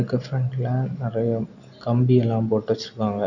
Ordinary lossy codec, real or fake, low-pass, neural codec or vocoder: none; real; 7.2 kHz; none